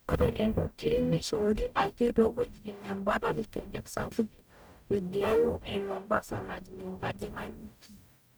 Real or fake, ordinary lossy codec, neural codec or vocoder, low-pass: fake; none; codec, 44.1 kHz, 0.9 kbps, DAC; none